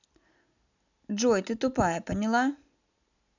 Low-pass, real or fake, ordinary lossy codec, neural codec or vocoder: 7.2 kHz; real; none; none